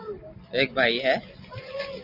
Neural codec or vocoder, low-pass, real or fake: none; 5.4 kHz; real